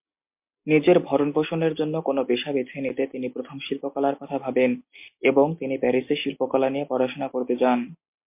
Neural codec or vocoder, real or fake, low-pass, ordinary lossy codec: none; real; 3.6 kHz; MP3, 32 kbps